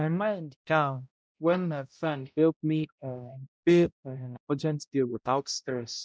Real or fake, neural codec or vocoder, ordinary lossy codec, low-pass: fake; codec, 16 kHz, 0.5 kbps, X-Codec, HuBERT features, trained on balanced general audio; none; none